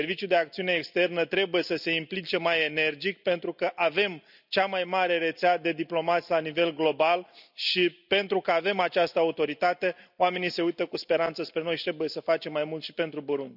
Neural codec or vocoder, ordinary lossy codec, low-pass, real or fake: none; none; 5.4 kHz; real